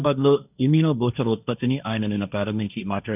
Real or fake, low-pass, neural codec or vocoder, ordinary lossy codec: fake; 3.6 kHz; codec, 16 kHz, 1.1 kbps, Voila-Tokenizer; none